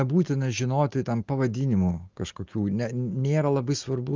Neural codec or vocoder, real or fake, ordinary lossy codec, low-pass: none; real; Opus, 32 kbps; 7.2 kHz